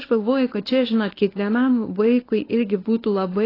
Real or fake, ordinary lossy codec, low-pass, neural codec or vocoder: fake; AAC, 24 kbps; 5.4 kHz; codec, 24 kHz, 0.9 kbps, WavTokenizer, medium speech release version 1